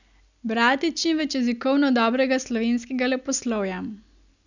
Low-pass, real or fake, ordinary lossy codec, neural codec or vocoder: 7.2 kHz; real; none; none